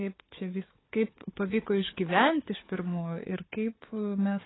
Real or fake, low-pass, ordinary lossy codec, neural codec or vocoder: fake; 7.2 kHz; AAC, 16 kbps; codec, 16 kHz, 4 kbps, FunCodec, trained on Chinese and English, 50 frames a second